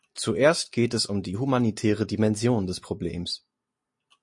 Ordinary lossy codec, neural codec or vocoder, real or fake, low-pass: MP3, 48 kbps; none; real; 10.8 kHz